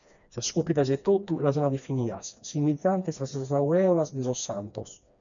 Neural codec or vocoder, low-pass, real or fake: codec, 16 kHz, 2 kbps, FreqCodec, smaller model; 7.2 kHz; fake